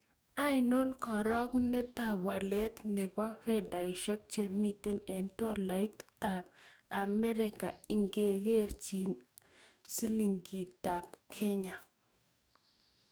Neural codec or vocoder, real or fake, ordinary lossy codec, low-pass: codec, 44.1 kHz, 2.6 kbps, DAC; fake; none; none